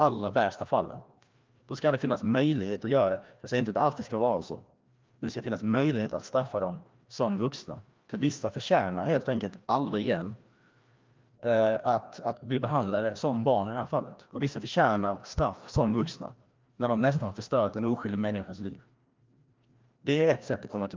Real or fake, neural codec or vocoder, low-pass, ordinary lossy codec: fake; codec, 16 kHz, 1 kbps, FreqCodec, larger model; 7.2 kHz; Opus, 24 kbps